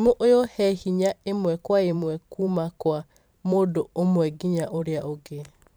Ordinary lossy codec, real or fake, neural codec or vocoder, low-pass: none; fake; vocoder, 44.1 kHz, 128 mel bands every 512 samples, BigVGAN v2; none